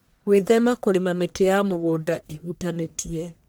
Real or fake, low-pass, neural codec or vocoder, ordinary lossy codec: fake; none; codec, 44.1 kHz, 1.7 kbps, Pupu-Codec; none